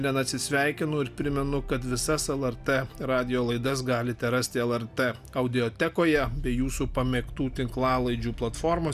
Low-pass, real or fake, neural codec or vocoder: 14.4 kHz; real; none